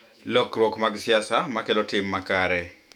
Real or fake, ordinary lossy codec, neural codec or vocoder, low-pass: fake; none; autoencoder, 48 kHz, 128 numbers a frame, DAC-VAE, trained on Japanese speech; 19.8 kHz